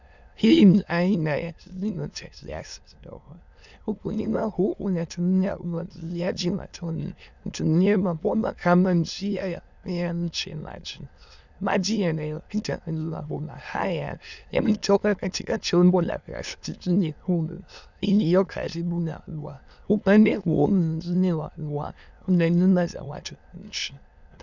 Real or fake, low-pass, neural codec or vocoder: fake; 7.2 kHz; autoencoder, 22.05 kHz, a latent of 192 numbers a frame, VITS, trained on many speakers